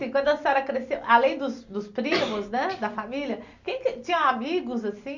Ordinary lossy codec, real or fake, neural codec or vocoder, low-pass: Opus, 64 kbps; real; none; 7.2 kHz